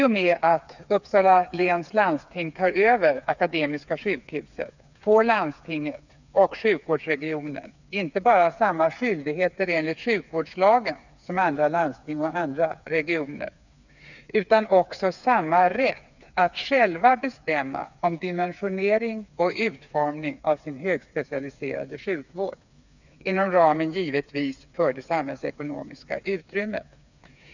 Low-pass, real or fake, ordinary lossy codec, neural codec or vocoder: 7.2 kHz; fake; none; codec, 16 kHz, 4 kbps, FreqCodec, smaller model